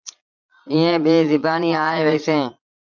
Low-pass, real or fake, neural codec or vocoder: 7.2 kHz; fake; vocoder, 22.05 kHz, 80 mel bands, Vocos